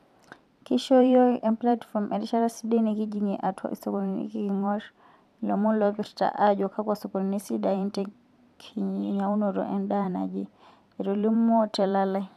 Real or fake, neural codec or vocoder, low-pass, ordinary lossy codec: fake; vocoder, 44.1 kHz, 128 mel bands every 512 samples, BigVGAN v2; 14.4 kHz; none